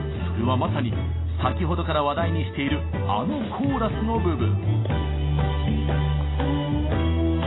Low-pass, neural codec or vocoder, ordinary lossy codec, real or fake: 7.2 kHz; none; AAC, 16 kbps; real